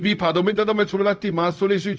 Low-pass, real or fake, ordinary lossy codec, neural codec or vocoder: none; fake; none; codec, 16 kHz, 0.4 kbps, LongCat-Audio-Codec